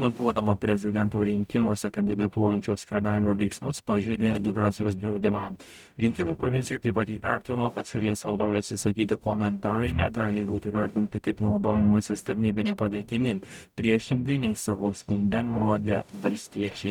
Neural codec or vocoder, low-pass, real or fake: codec, 44.1 kHz, 0.9 kbps, DAC; 19.8 kHz; fake